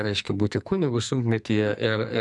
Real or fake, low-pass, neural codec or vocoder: fake; 10.8 kHz; codec, 44.1 kHz, 2.6 kbps, SNAC